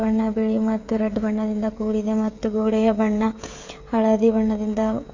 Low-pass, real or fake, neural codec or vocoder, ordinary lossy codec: 7.2 kHz; fake; codec, 16 kHz, 16 kbps, FreqCodec, smaller model; AAC, 48 kbps